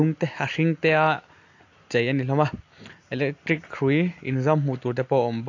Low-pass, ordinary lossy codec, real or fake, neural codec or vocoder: 7.2 kHz; AAC, 48 kbps; real; none